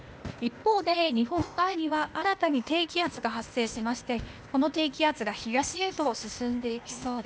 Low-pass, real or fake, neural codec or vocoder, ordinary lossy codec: none; fake; codec, 16 kHz, 0.8 kbps, ZipCodec; none